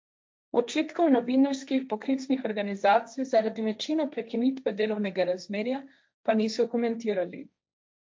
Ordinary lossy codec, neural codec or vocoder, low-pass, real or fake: none; codec, 16 kHz, 1.1 kbps, Voila-Tokenizer; none; fake